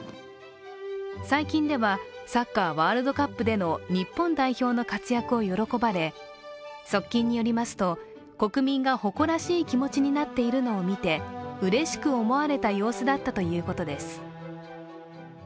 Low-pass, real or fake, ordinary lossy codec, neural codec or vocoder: none; real; none; none